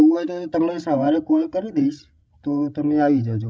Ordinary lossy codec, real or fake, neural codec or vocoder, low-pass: none; fake; codec, 16 kHz, 16 kbps, FreqCodec, larger model; none